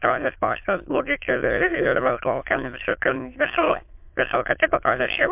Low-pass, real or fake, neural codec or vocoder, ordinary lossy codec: 3.6 kHz; fake; autoencoder, 22.05 kHz, a latent of 192 numbers a frame, VITS, trained on many speakers; MP3, 32 kbps